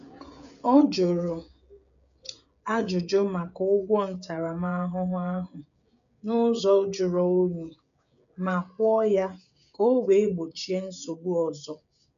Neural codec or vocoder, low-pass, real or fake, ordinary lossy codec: codec, 16 kHz, 16 kbps, FreqCodec, smaller model; 7.2 kHz; fake; none